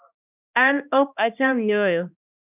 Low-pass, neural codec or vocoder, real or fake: 3.6 kHz; codec, 16 kHz, 1 kbps, X-Codec, HuBERT features, trained on balanced general audio; fake